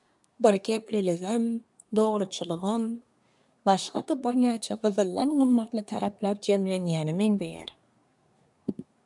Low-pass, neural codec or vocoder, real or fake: 10.8 kHz; codec, 24 kHz, 1 kbps, SNAC; fake